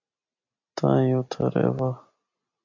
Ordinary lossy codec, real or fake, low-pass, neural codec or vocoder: AAC, 48 kbps; real; 7.2 kHz; none